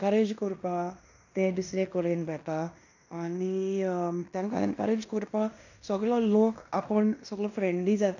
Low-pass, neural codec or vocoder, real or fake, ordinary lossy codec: 7.2 kHz; codec, 16 kHz in and 24 kHz out, 0.9 kbps, LongCat-Audio-Codec, fine tuned four codebook decoder; fake; none